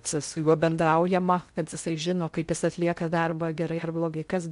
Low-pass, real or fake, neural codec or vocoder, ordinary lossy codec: 10.8 kHz; fake; codec, 16 kHz in and 24 kHz out, 0.6 kbps, FocalCodec, streaming, 2048 codes; MP3, 64 kbps